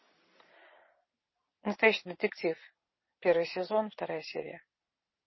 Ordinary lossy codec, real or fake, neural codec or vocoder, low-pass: MP3, 24 kbps; real; none; 7.2 kHz